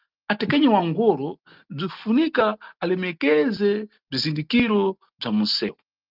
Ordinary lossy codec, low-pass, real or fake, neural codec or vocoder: Opus, 32 kbps; 5.4 kHz; real; none